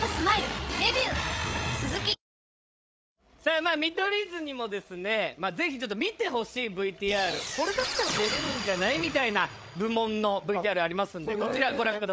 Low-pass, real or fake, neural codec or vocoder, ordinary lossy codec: none; fake; codec, 16 kHz, 8 kbps, FreqCodec, larger model; none